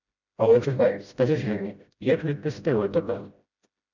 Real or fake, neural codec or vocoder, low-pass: fake; codec, 16 kHz, 0.5 kbps, FreqCodec, smaller model; 7.2 kHz